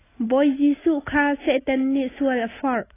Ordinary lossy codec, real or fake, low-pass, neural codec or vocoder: AAC, 16 kbps; real; 3.6 kHz; none